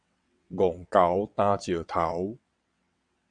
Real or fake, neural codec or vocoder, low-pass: fake; vocoder, 22.05 kHz, 80 mel bands, WaveNeXt; 9.9 kHz